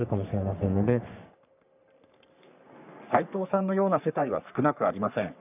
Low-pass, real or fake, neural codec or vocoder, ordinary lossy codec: 3.6 kHz; fake; codec, 44.1 kHz, 3.4 kbps, Pupu-Codec; none